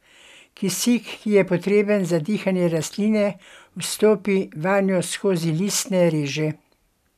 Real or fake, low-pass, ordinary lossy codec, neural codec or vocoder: real; 14.4 kHz; none; none